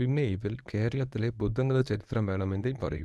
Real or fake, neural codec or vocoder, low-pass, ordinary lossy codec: fake; codec, 24 kHz, 0.9 kbps, WavTokenizer, medium speech release version 1; none; none